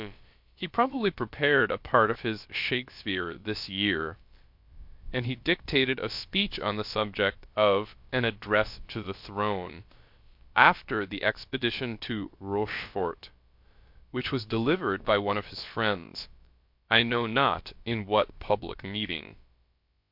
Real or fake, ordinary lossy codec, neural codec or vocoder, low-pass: fake; MP3, 48 kbps; codec, 16 kHz, about 1 kbps, DyCAST, with the encoder's durations; 5.4 kHz